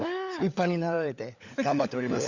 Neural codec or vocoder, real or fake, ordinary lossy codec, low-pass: codec, 16 kHz, 16 kbps, FunCodec, trained on LibriTTS, 50 frames a second; fake; Opus, 64 kbps; 7.2 kHz